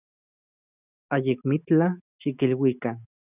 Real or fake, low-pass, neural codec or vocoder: real; 3.6 kHz; none